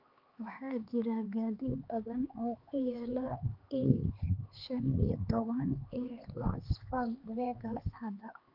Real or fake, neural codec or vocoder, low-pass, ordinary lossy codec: fake; codec, 16 kHz, 4 kbps, X-Codec, HuBERT features, trained on LibriSpeech; 5.4 kHz; Opus, 32 kbps